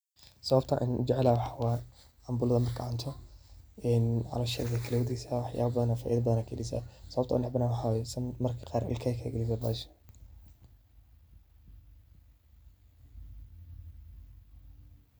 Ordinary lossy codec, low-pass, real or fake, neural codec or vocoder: none; none; real; none